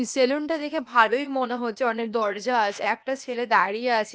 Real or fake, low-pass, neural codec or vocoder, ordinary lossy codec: fake; none; codec, 16 kHz, 0.8 kbps, ZipCodec; none